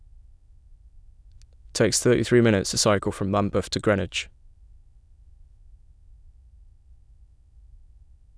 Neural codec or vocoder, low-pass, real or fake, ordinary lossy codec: autoencoder, 22.05 kHz, a latent of 192 numbers a frame, VITS, trained on many speakers; none; fake; none